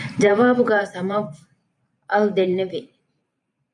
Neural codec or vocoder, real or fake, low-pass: vocoder, 44.1 kHz, 128 mel bands every 512 samples, BigVGAN v2; fake; 10.8 kHz